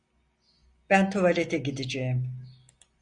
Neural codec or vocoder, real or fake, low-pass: none; real; 9.9 kHz